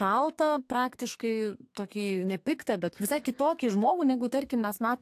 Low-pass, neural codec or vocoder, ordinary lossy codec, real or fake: 14.4 kHz; codec, 32 kHz, 1.9 kbps, SNAC; AAC, 64 kbps; fake